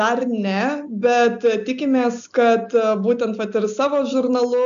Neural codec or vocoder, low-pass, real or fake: none; 7.2 kHz; real